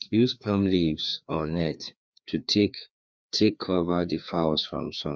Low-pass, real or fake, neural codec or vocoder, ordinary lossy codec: none; fake; codec, 16 kHz, 2 kbps, FreqCodec, larger model; none